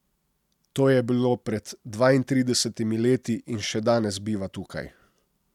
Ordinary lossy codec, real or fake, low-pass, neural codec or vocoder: none; real; 19.8 kHz; none